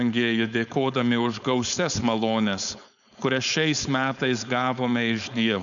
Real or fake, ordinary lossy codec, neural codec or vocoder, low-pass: fake; MP3, 96 kbps; codec, 16 kHz, 4.8 kbps, FACodec; 7.2 kHz